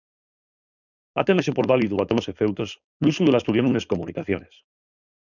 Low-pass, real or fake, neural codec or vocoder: 7.2 kHz; fake; codec, 16 kHz, 4.8 kbps, FACodec